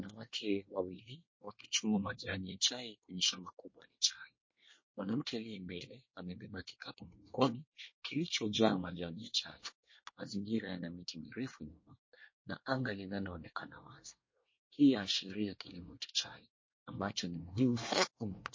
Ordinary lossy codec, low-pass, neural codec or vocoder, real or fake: MP3, 32 kbps; 7.2 kHz; codec, 24 kHz, 1 kbps, SNAC; fake